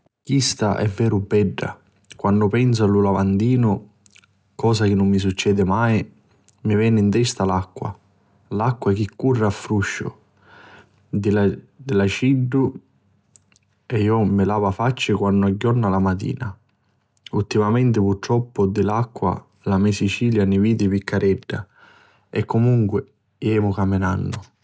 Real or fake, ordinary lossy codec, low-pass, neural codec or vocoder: real; none; none; none